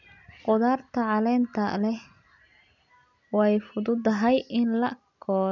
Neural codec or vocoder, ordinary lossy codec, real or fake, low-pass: none; none; real; 7.2 kHz